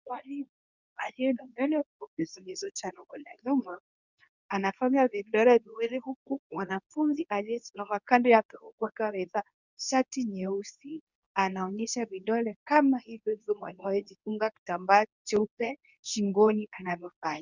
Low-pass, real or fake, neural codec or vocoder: 7.2 kHz; fake; codec, 24 kHz, 0.9 kbps, WavTokenizer, medium speech release version 2